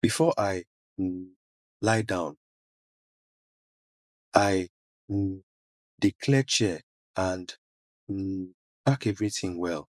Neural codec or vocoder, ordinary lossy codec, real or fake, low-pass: vocoder, 24 kHz, 100 mel bands, Vocos; none; fake; none